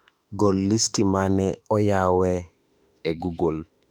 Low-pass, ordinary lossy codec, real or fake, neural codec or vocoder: 19.8 kHz; none; fake; autoencoder, 48 kHz, 32 numbers a frame, DAC-VAE, trained on Japanese speech